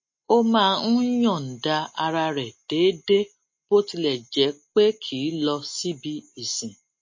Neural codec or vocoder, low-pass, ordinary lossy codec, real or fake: none; 7.2 kHz; MP3, 32 kbps; real